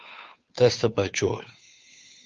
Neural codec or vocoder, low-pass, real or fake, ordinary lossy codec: codec, 16 kHz, 2 kbps, FunCodec, trained on Chinese and English, 25 frames a second; 7.2 kHz; fake; Opus, 32 kbps